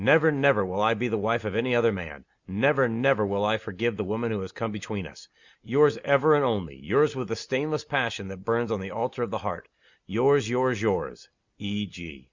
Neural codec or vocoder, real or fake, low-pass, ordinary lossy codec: none; real; 7.2 kHz; Opus, 64 kbps